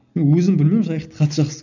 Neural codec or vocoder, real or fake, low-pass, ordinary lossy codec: none; real; 7.2 kHz; none